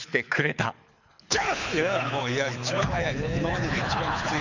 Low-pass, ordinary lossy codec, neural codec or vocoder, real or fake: 7.2 kHz; none; codec, 24 kHz, 6 kbps, HILCodec; fake